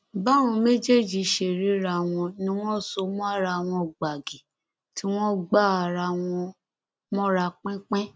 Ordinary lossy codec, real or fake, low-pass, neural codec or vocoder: none; real; none; none